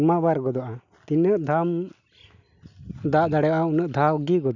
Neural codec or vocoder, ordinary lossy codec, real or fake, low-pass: none; none; real; 7.2 kHz